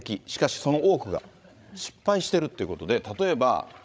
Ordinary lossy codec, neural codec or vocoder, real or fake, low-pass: none; codec, 16 kHz, 16 kbps, FreqCodec, larger model; fake; none